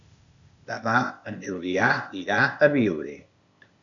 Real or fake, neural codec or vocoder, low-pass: fake; codec, 16 kHz, 0.8 kbps, ZipCodec; 7.2 kHz